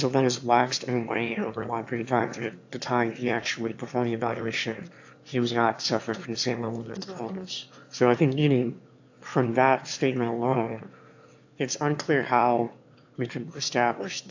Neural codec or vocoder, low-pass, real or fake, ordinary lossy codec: autoencoder, 22.05 kHz, a latent of 192 numbers a frame, VITS, trained on one speaker; 7.2 kHz; fake; AAC, 48 kbps